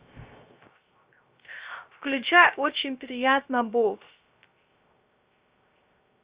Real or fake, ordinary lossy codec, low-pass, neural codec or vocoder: fake; Opus, 64 kbps; 3.6 kHz; codec, 16 kHz, 0.3 kbps, FocalCodec